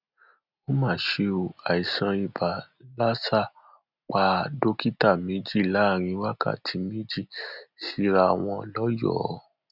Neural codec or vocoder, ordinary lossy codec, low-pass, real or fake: none; none; 5.4 kHz; real